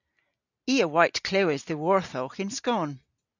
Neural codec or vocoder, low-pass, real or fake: none; 7.2 kHz; real